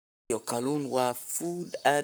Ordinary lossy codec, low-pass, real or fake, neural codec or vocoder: none; none; fake; codec, 44.1 kHz, 7.8 kbps, Pupu-Codec